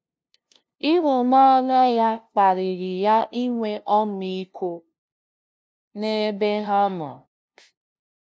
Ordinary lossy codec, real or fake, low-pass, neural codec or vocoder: none; fake; none; codec, 16 kHz, 0.5 kbps, FunCodec, trained on LibriTTS, 25 frames a second